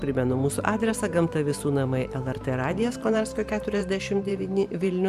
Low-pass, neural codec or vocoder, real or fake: 14.4 kHz; vocoder, 44.1 kHz, 128 mel bands every 512 samples, BigVGAN v2; fake